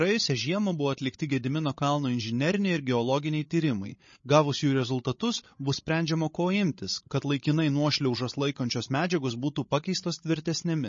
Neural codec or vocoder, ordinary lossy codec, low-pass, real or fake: codec, 16 kHz, 16 kbps, FreqCodec, larger model; MP3, 32 kbps; 7.2 kHz; fake